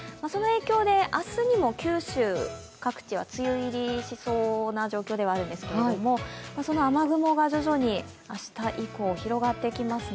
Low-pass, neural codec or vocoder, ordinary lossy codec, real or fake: none; none; none; real